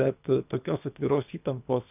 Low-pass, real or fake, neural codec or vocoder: 3.6 kHz; fake; codec, 24 kHz, 3 kbps, HILCodec